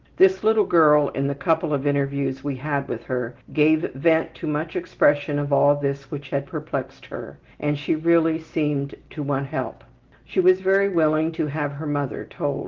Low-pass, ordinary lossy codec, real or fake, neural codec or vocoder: 7.2 kHz; Opus, 16 kbps; real; none